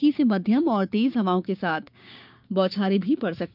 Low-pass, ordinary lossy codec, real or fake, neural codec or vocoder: 5.4 kHz; none; fake; codec, 16 kHz, 6 kbps, DAC